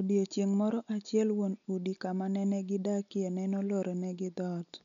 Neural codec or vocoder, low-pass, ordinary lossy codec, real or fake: none; 7.2 kHz; none; real